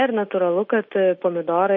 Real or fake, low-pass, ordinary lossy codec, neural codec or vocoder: real; 7.2 kHz; MP3, 32 kbps; none